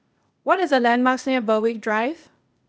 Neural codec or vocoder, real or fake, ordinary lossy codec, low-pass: codec, 16 kHz, 0.8 kbps, ZipCodec; fake; none; none